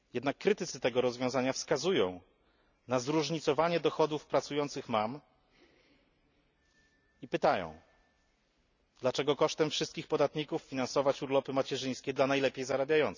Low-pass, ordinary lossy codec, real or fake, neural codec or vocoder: 7.2 kHz; none; real; none